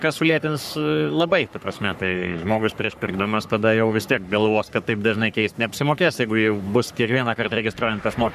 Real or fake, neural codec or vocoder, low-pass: fake; codec, 44.1 kHz, 3.4 kbps, Pupu-Codec; 14.4 kHz